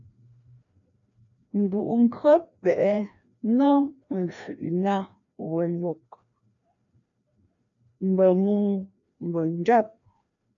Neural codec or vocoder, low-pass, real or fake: codec, 16 kHz, 1 kbps, FreqCodec, larger model; 7.2 kHz; fake